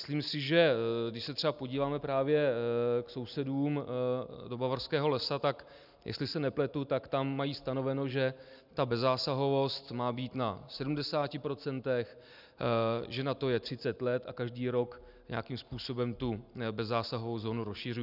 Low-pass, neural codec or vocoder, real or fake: 5.4 kHz; none; real